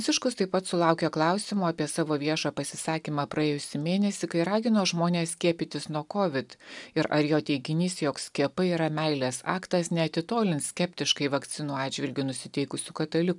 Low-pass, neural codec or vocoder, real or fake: 10.8 kHz; none; real